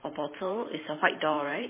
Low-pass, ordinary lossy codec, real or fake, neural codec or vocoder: 3.6 kHz; MP3, 16 kbps; fake; vocoder, 44.1 kHz, 128 mel bands every 512 samples, BigVGAN v2